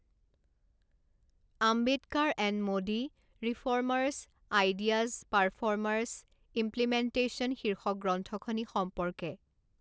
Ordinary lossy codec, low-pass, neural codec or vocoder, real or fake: none; none; none; real